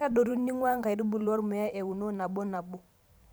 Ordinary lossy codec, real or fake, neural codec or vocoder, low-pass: none; fake; vocoder, 44.1 kHz, 128 mel bands every 512 samples, BigVGAN v2; none